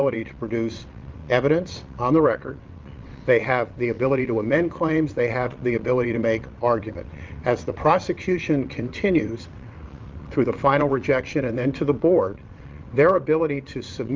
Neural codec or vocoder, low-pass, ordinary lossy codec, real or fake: vocoder, 22.05 kHz, 80 mel bands, WaveNeXt; 7.2 kHz; Opus, 32 kbps; fake